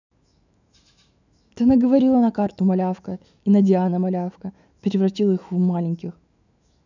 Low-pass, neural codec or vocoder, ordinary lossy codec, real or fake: 7.2 kHz; autoencoder, 48 kHz, 128 numbers a frame, DAC-VAE, trained on Japanese speech; none; fake